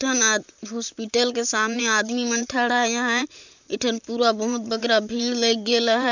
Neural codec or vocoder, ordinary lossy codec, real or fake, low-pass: vocoder, 44.1 kHz, 128 mel bands, Pupu-Vocoder; none; fake; 7.2 kHz